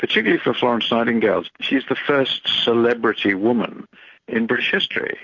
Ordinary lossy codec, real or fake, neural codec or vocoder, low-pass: MP3, 48 kbps; real; none; 7.2 kHz